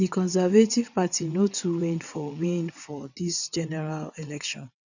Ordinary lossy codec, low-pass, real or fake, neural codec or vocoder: none; 7.2 kHz; fake; vocoder, 22.05 kHz, 80 mel bands, WaveNeXt